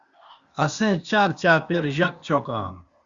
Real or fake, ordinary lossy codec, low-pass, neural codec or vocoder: fake; Opus, 64 kbps; 7.2 kHz; codec, 16 kHz, 0.8 kbps, ZipCodec